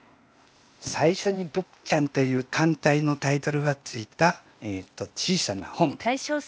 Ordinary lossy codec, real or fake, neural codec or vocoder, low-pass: none; fake; codec, 16 kHz, 0.8 kbps, ZipCodec; none